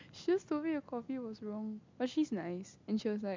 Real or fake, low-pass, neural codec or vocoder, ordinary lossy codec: real; 7.2 kHz; none; MP3, 64 kbps